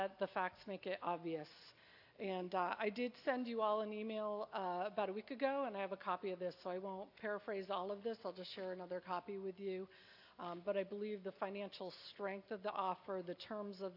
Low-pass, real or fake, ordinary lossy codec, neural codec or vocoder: 5.4 kHz; real; AAC, 48 kbps; none